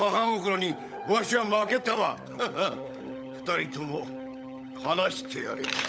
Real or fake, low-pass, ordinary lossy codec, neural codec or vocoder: fake; none; none; codec, 16 kHz, 16 kbps, FunCodec, trained on LibriTTS, 50 frames a second